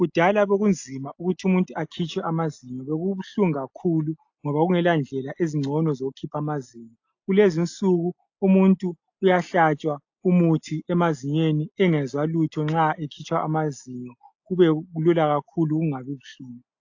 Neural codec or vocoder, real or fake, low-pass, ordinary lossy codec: none; real; 7.2 kHz; AAC, 48 kbps